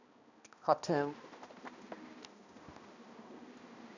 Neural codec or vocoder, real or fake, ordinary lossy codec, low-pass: codec, 16 kHz, 1 kbps, X-Codec, HuBERT features, trained on balanced general audio; fake; none; 7.2 kHz